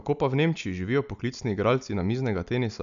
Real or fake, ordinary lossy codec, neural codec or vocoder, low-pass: real; none; none; 7.2 kHz